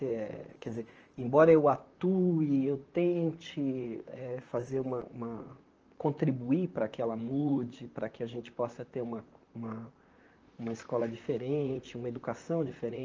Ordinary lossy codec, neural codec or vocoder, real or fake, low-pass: Opus, 24 kbps; vocoder, 44.1 kHz, 128 mel bands, Pupu-Vocoder; fake; 7.2 kHz